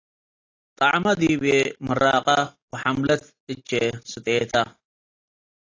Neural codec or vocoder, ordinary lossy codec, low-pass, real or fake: none; AAC, 32 kbps; 7.2 kHz; real